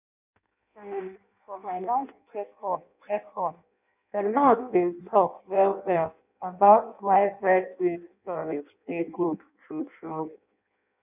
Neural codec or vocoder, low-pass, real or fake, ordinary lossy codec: codec, 16 kHz in and 24 kHz out, 0.6 kbps, FireRedTTS-2 codec; 3.6 kHz; fake; none